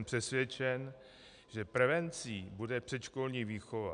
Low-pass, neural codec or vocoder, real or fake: 9.9 kHz; none; real